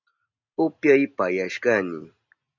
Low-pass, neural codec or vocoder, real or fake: 7.2 kHz; none; real